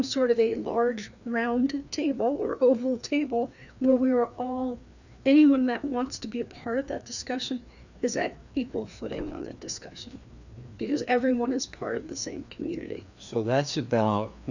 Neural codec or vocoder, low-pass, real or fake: codec, 16 kHz, 2 kbps, FreqCodec, larger model; 7.2 kHz; fake